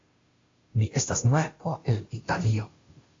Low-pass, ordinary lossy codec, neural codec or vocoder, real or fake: 7.2 kHz; AAC, 32 kbps; codec, 16 kHz, 0.5 kbps, FunCodec, trained on Chinese and English, 25 frames a second; fake